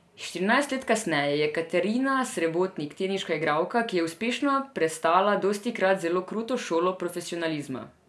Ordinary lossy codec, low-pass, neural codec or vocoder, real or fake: none; none; none; real